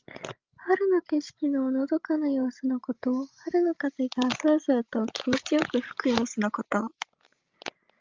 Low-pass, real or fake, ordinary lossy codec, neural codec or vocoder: 7.2 kHz; fake; Opus, 24 kbps; codec, 16 kHz, 16 kbps, FreqCodec, larger model